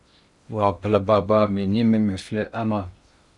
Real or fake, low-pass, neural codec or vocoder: fake; 10.8 kHz; codec, 16 kHz in and 24 kHz out, 0.6 kbps, FocalCodec, streaming, 2048 codes